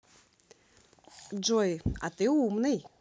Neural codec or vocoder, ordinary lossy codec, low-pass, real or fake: none; none; none; real